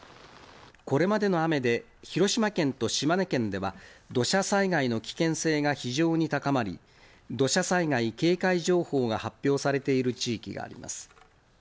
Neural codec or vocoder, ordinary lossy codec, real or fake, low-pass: none; none; real; none